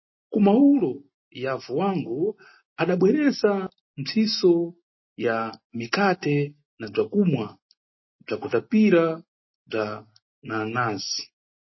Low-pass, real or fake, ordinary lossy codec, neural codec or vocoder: 7.2 kHz; real; MP3, 24 kbps; none